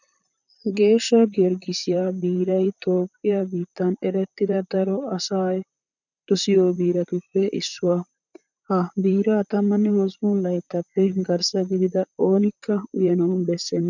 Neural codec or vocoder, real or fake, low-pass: vocoder, 44.1 kHz, 128 mel bands, Pupu-Vocoder; fake; 7.2 kHz